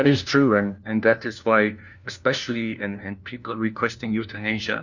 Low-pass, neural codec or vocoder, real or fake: 7.2 kHz; codec, 16 kHz, 1 kbps, FunCodec, trained on LibriTTS, 50 frames a second; fake